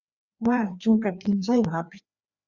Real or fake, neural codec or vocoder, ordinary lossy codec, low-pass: fake; codec, 16 kHz, 2 kbps, FreqCodec, larger model; Opus, 64 kbps; 7.2 kHz